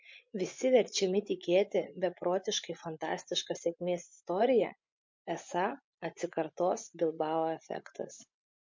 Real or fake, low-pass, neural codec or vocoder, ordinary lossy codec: fake; 7.2 kHz; vocoder, 24 kHz, 100 mel bands, Vocos; MP3, 48 kbps